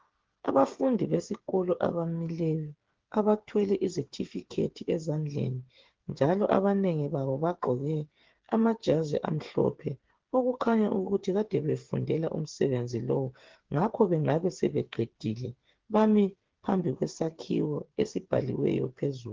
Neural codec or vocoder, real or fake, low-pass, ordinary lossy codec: codec, 16 kHz, 8 kbps, FreqCodec, smaller model; fake; 7.2 kHz; Opus, 16 kbps